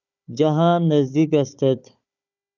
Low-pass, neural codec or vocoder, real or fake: 7.2 kHz; codec, 16 kHz, 4 kbps, FunCodec, trained on Chinese and English, 50 frames a second; fake